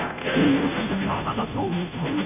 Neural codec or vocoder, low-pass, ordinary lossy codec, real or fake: codec, 16 kHz, 0.5 kbps, FunCodec, trained on Chinese and English, 25 frames a second; 3.6 kHz; none; fake